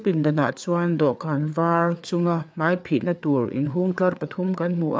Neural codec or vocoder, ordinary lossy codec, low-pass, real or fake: codec, 16 kHz, 4 kbps, FreqCodec, larger model; none; none; fake